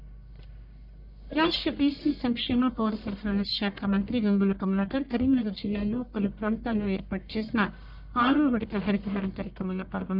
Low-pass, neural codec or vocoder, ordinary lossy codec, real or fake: 5.4 kHz; codec, 44.1 kHz, 1.7 kbps, Pupu-Codec; none; fake